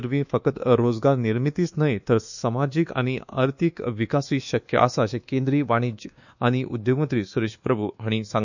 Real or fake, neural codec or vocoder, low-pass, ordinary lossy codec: fake; codec, 24 kHz, 1.2 kbps, DualCodec; 7.2 kHz; none